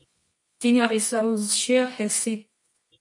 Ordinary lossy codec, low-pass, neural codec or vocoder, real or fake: MP3, 48 kbps; 10.8 kHz; codec, 24 kHz, 0.9 kbps, WavTokenizer, medium music audio release; fake